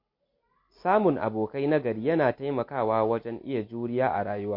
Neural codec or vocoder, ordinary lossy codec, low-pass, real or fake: none; MP3, 32 kbps; 5.4 kHz; real